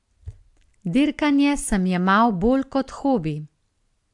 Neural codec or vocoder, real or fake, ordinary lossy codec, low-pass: none; real; AAC, 64 kbps; 10.8 kHz